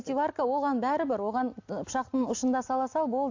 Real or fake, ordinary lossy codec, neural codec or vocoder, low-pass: real; AAC, 48 kbps; none; 7.2 kHz